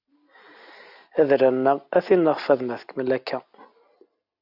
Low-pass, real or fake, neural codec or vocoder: 5.4 kHz; real; none